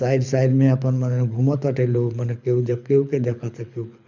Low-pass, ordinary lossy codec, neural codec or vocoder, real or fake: 7.2 kHz; none; codec, 24 kHz, 6 kbps, HILCodec; fake